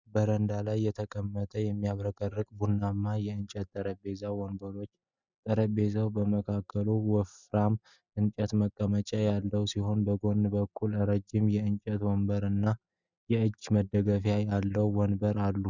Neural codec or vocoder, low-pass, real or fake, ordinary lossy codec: none; 7.2 kHz; real; Opus, 64 kbps